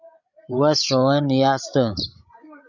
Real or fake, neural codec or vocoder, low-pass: fake; codec, 16 kHz, 16 kbps, FreqCodec, larger model; 7.2 kHz